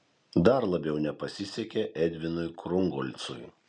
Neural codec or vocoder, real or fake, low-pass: none; real; 9.9 kHz